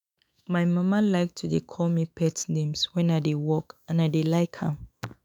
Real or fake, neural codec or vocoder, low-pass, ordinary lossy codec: fake; autoencoder, 48 kHz, 128 numbers a frame, DAC-VAE, trained on Japanese speech; none; none